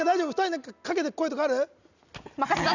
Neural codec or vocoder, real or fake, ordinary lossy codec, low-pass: none; real; none; 7.2 kHz